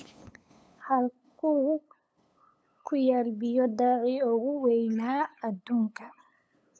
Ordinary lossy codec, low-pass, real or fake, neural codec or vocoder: none; none; fake; codec, 16 kHz, 8 kbps, FunCodec, trained on LibriTTS, 25 frames a second